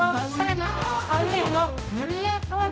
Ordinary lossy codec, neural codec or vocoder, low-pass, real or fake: none; codec, 16 kHz, 0.5 kbps, X-Codec, HuBERT features, trained on general audio; none; fake